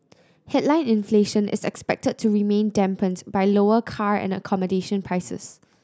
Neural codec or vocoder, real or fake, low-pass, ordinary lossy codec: none; real; none; none